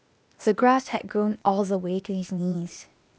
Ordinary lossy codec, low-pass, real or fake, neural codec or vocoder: none; none; fake; codec, 16 kHz, 0.8 kbps, ZipCodec